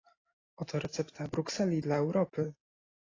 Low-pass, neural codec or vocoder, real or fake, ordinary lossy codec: 7.2 kHz; none; real; AAC, 32 kbps